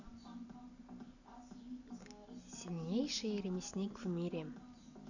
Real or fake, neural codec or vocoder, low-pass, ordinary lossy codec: real; none; 7.2 kHz; none